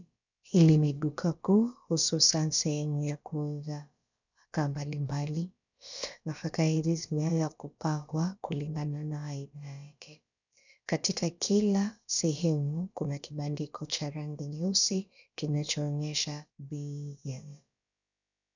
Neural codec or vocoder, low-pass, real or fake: codec, 16 kHz, about 1 kbps, DyCAST, with the encoder's durations; 7.2 kHz; fake